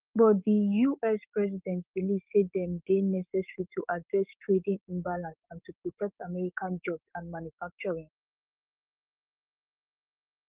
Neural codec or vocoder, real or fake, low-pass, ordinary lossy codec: codec, 16 kHz, 6 kbps, DAC; fake; 3.6 kHz; Opus, 32 kbps